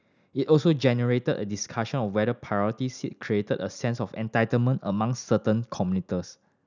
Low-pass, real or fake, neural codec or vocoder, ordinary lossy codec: 7.2 kHz; real; none; none